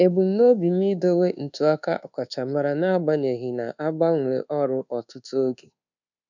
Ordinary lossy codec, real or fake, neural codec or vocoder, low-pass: none; fake; codec, 24 kHz, 1.2 kbps, DualCodec; 7.2 kHz